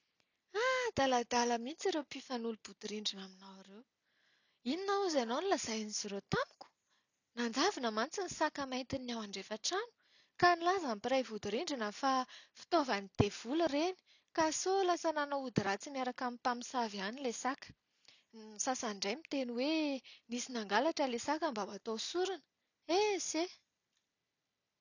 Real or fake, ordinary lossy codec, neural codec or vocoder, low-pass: real; none; none; 7.2 kHz